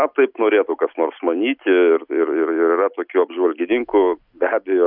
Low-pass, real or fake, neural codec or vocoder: 5.4 kHz; real; none